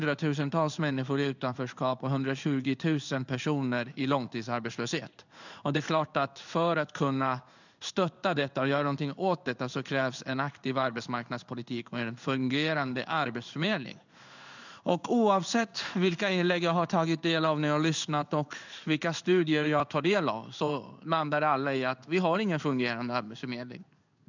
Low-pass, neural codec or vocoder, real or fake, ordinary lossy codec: 7.2 kHz; codec, 16 kHz in and 24 kHz out, 1 kbps, XY-Tokenizer; fake; none